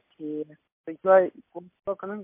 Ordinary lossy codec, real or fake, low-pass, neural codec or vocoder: MP3, 24 kbps; real; 3.6 kHz; none